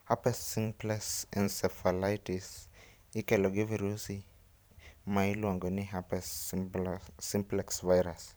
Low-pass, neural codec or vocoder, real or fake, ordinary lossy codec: none; vocoder, 44.1 kHz, 128 mel bands every 512 samples, BigVGAN v2; fake; none